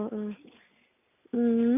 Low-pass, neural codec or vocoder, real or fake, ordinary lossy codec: 3.6 kHz; none; real; none